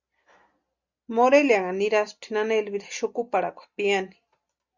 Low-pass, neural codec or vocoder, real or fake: 7.2 kHz; none; real